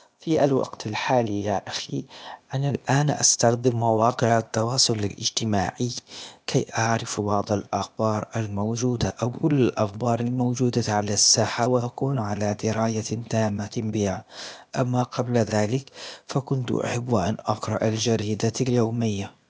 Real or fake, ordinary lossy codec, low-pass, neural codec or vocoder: fake; none; none; codec, 16 kHz, 0.8 kbps, ZipCodec